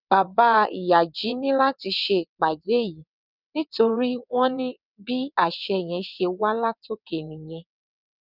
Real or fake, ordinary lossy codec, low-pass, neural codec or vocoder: fake; none; 5.4 kHz; vocoder, 22.05 kHz, 80 mel bands, WaveNeXt